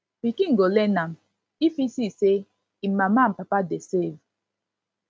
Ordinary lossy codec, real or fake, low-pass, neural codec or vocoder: none; real; none; none